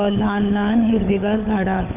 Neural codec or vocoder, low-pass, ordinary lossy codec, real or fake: codec, 16 kHz, 16 kbps, FunCodec, trained on LibriTTS, 50 frames a second; 3.6 kHz; none; fake